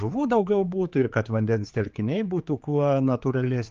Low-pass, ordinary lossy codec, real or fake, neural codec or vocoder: 7.2 kHz; Opus, 24 kbps; fake; codec, 16 kHz, 4 kbps, X-Codec, HuBERT features, trained on general audio